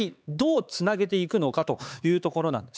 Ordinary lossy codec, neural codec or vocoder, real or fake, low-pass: none; codec, 16 kHz, 4 kbps, X-Codec, HuBERT features, trained on LibriSpeech; fake; none